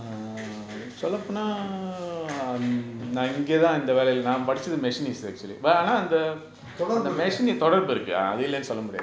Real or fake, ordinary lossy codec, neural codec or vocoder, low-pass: real; none; none; none